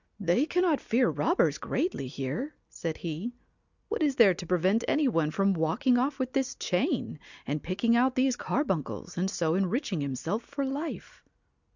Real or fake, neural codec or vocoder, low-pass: real; none; 7.2 kHz